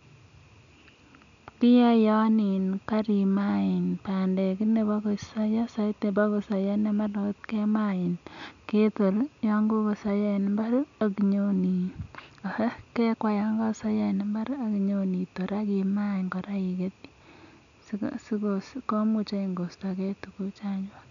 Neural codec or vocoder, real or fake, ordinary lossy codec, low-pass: none; real; none; 7.2 kHz